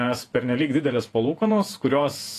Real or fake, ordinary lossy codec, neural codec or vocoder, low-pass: fake; AAC, 48 kbps; vocoder, 48 kHz, 128 mel bands, Vocos; 14.4 kHz